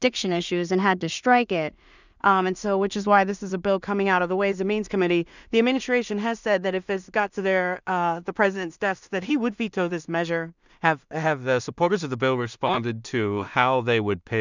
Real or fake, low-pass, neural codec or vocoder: fake; 7.2 kHz; codec, 16 kHz in and 24 kHz out, 0.4 kbps, LongCat-Audio-Codec, two codebook decoder